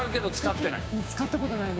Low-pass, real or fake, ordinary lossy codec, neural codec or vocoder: none; fake; none; codec, 16 kHz, 6 kbps, DAC